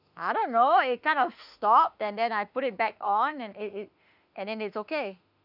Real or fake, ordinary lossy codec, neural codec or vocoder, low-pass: fake; none; autoencoder, 48 kHz, 32 numbers a frame, DAC-VAE, trained on Japanese speech; 5.4 kHz